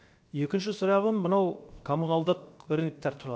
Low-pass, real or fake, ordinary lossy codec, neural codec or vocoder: none; fake; none; codec, 16 kHz, 0.7 kbps, FocalCodec